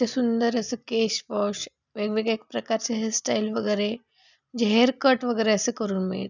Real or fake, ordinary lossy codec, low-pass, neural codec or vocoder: real; none; 7.2 kHz; none